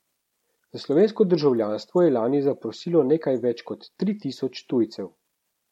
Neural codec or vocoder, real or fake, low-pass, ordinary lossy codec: none; real; 19.8 kHz; MP3, 64 kbps